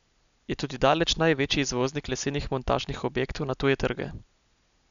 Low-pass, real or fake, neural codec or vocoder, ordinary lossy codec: 7.2 kHz; real; none; none